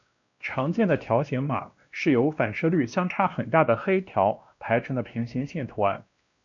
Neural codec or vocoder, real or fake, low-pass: codec, 16 kHz, 1 kbps, X-Codec, WavLM features, trained on Multilingual LibriSpeech; fake; 7.2 kHz